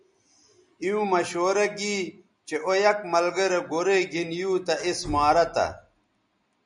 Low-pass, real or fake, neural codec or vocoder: 9.9 kHz; real; none